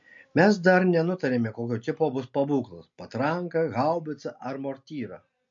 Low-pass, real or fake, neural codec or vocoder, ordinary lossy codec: 7.2 kHz; real; none; MP3, 48 kbps